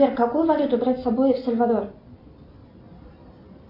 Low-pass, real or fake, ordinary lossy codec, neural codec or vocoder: 5.4 kHz; real; AAC, 48 kbps; none